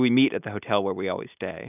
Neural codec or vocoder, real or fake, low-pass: none; real; 3.6 kHz